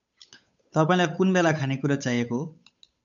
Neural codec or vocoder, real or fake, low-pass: codec, 16 kHz, 8 kbps, FunCodec, trained on Chinese and English, 25 frames a second; fake; 7.2 kHz